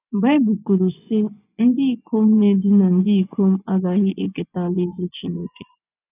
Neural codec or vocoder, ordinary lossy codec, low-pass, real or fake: vocoder, 24 kHz, 100 mel bands, Vocos; none; 3.6 kHz; fake